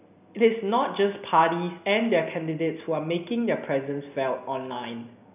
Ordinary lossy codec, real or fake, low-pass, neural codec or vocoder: none; real; 3.6 kHz; none